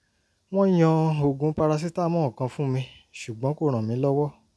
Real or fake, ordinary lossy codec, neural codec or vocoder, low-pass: real; none; none; none